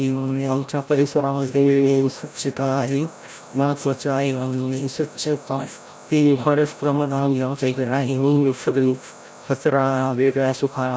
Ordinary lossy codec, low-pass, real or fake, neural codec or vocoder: none; none; fake; codec, 16 kHz, 0.5 kbps, FreqCodec, larger model